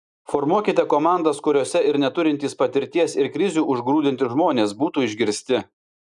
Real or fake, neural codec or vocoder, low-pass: real; none; 10.8 kHz